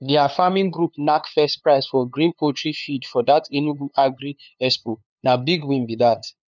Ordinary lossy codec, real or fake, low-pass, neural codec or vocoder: none; fake; 7.2 kHz; codec, 16 kHz, 2 kbps, FunCodec, trained on LibriTTS, 25 frames a second